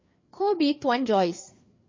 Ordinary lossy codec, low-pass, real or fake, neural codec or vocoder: MP3, 32 kbps; 7.2 kHz; fake; codec, 16 kHz, 4 kbps, FreqCodec, larger model